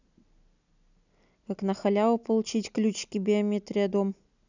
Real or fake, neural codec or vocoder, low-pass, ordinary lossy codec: real; none; 7.2 kHz; none